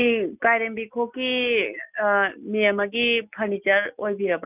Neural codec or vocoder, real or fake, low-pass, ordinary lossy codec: none; real; 3.6 kHz; none